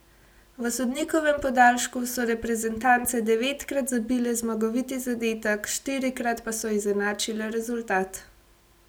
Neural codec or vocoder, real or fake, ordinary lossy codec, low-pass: none; real; none; none